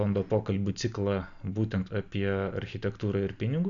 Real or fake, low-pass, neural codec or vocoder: real; 7.2 kHz; none